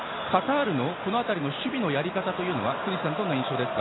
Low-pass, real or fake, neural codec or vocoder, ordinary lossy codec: 7.2 kHz; real; none; AAC, 16 kbps